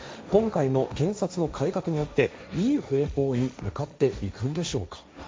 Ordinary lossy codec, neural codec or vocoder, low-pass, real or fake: none; codec, 16 kHz, 1.1 kbps, Voila-Tokenizer; none; fake